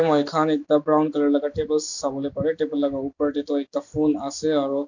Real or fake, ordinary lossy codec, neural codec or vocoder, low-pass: real; none; none; 7.2 kHz